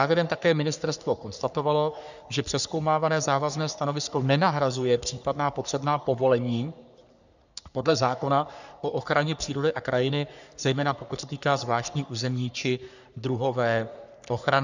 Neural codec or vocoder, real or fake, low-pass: codec, 44.1 kHz, 3.4 kbps, Pupu-Codec; fake; 7.2 kHz